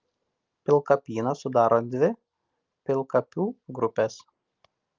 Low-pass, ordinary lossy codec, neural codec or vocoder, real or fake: 7.2 kHz; Opus, 24 kbps; none; real